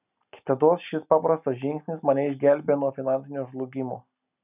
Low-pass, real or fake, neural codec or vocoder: 3.6 kHz; real; none